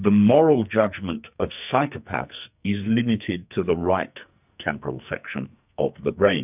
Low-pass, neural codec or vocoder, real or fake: 3.6 kHz; codec, 44.1 kHz, 2.6 kbps, SNAC; fake